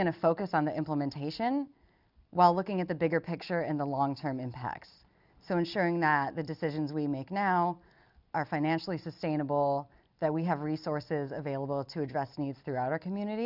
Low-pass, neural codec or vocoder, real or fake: 5.4 kHz; none; real